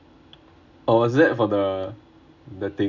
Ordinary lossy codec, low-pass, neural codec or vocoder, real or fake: none; 7.2 kHz; none; real